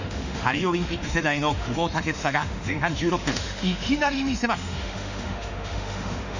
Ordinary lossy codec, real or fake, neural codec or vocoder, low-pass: none; fake; autoencoder, 48 kHz, 32 numbers a frame, DAC-VAE, trained on Japanese speech; 7.2 kHz